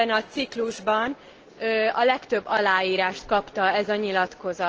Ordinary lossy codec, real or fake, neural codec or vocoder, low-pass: Opus, 16 kbps; real; none; 7.2 kHz